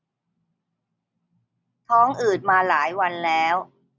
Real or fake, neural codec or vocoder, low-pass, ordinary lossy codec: real; none; none; none